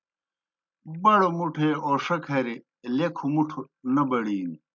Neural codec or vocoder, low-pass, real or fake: none; 7.2 kHz; real